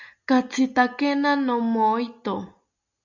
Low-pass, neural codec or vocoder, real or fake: 7.2 kHz; none; real